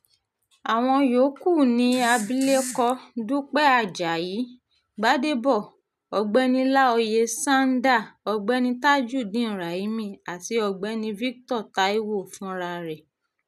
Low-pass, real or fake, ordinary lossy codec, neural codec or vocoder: 14.4 kHz; real; none; none